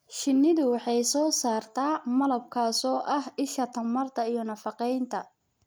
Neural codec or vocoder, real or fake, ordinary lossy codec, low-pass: none; real; none; none